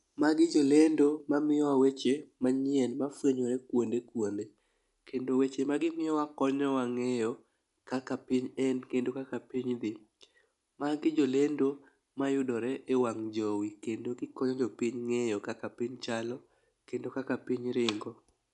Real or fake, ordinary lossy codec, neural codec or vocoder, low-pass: real; none; none; 10.8 kHz